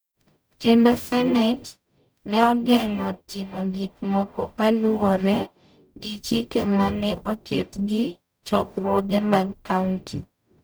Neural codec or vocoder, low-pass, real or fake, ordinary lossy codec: codec, 44.1 kHz, 0.9 kbps, DAC; none; fake; none